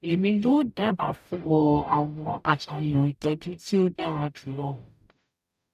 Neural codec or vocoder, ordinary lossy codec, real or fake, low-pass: codec, 44.1 kHz, 0.9 kbps, DAC; none; fake; 14.4 kHz